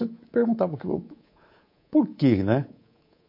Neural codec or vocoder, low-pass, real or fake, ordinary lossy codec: codec, 24 kHz, 3.1 kbps, DualCodec; 5.4 kHz; fake; MP3, 32 kbps